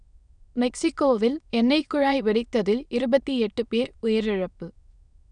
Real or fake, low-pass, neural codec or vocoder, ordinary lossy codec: fake; 9.9 kHz; autoencoder, 22.05 kHz, a latent of 192 numbers a frame, VITS, trained on many speakers; none